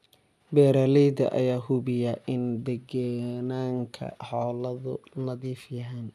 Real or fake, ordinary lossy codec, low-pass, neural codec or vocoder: real; none; 14.4 kHz; none